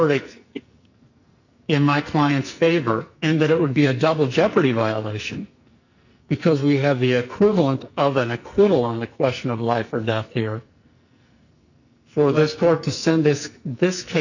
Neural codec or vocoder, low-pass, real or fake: codec, 44.1 kHz, 2.6 kbps, SNAC; 7.2 kHz; fake